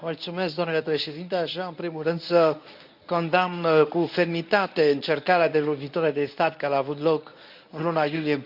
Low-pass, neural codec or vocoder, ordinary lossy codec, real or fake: 5.4 kHz; codec, 24 kHz, 0.9 kbps, WavTokenizer, medium speech release version 1; none; fake